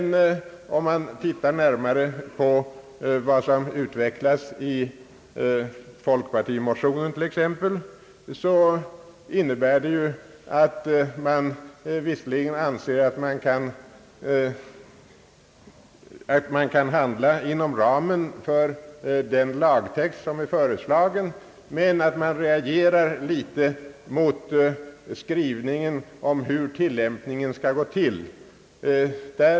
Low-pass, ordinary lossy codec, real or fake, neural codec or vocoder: none; none; real; none